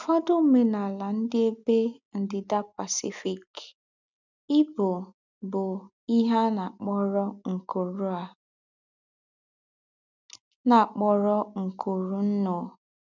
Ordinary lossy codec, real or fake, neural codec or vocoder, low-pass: none; real; none; 7.2 kHz